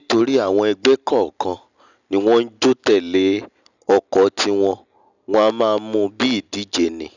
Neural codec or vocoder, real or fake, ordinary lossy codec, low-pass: none; real; none; 7.2 kHz